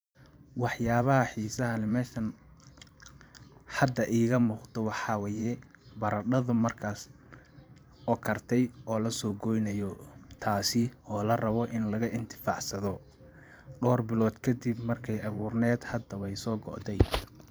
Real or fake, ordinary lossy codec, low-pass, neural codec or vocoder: fake; none; none; vocoder, 44.1 kHz, 128 mel bands every 512 samples, BigVGAN v2